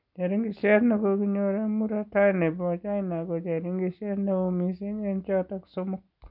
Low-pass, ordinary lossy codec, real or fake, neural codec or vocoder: 5.4 kHz; none; real; none